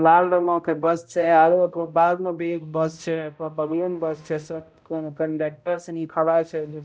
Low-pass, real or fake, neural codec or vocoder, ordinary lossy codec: none; fake; codec, 16 kHz, 0.5 kbps, X-Codec, HuBERT features, trained on balanced general audio; none